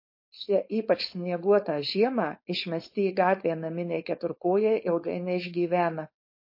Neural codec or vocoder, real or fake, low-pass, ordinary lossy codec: codec, 16 kHz, 4.8 kbps, FACodec; fake; 5.4 kHz; MP3, 32 kbps